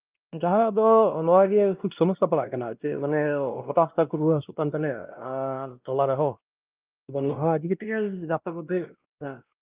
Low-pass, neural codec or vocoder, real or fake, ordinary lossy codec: 3.6 kHz; codec, 16 kHz, 1 kbps, X-Codec, WavLM features, trained on Multilingual LibriSpeech; fake; Opus, 24 kbps